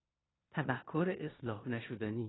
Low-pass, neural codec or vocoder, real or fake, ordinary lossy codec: 7.2 kHz; codec, 16 kHz in and 24 kHz out, 0.9 kbps, LongCat-Audio-Codec, four codebook decoder; fake; AAC, 16 kbps